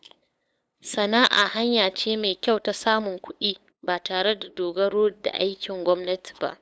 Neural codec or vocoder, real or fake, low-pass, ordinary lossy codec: codec, 16 kHz, 8 kbps, FunCodec, trained on LibriTTS, 25 frames a second; fake; none; none